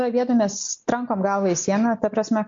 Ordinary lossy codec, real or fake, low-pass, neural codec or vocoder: MP3, 48 kbps; real; 7.2 kHz; none